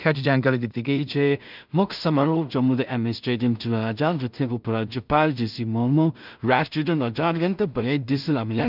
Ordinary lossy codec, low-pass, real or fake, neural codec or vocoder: none; 5.4 kHz; fake; codec, 16 kHz in and 24 kHz out, 0.4 kbps, LongCat-Audio-Codec, two codebook decoder